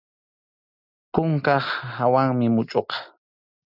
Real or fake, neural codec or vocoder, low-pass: real; none; 5.4 kHz